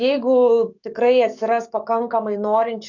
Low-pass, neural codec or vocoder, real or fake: 7.2 kHz; codec, 44.1 kHz, 7.8 kbps, DAC; fake